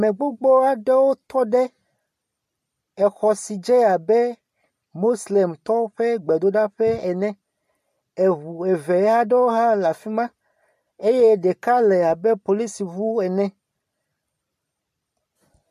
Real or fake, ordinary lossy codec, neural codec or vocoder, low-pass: real; MP3, 64 kbps; none; 14.4 kHz